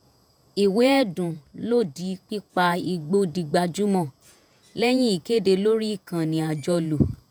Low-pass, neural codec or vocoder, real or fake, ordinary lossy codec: none; vocoder, 48 kHz, 128 mel bands, Vocos; fake; none